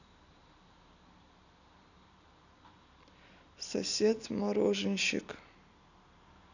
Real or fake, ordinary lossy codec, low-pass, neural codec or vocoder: real; none; 7.2 kHz; none